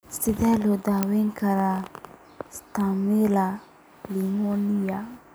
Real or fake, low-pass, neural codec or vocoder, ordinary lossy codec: real; none; none; none